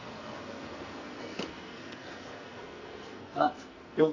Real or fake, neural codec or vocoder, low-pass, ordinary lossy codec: fake; codec, 44.1 kHz, 2.6 kbps, SNAC; 7.2 kHz; none